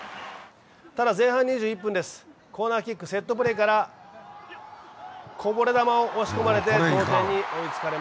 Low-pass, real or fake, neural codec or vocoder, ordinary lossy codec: none; real; none; none